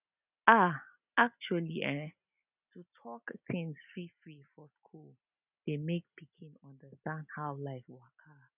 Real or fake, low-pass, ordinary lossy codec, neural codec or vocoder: real; 3.6 kHz; none; none